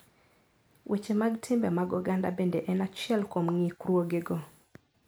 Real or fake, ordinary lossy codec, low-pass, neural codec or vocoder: real; none; none; none